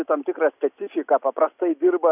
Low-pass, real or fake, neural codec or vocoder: 3.6 kHz; real; none